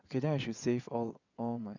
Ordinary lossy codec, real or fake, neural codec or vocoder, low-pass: none; fake; vocoder, 22.05 kHz, 80 mel bands, WaveNeXt; 7.2 kHz